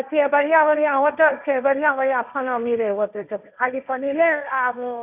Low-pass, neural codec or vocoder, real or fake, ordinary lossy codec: 3.6 kHz; codec, 16 kHz, 1.1 kbps, Voila-Tokenizer; fake; none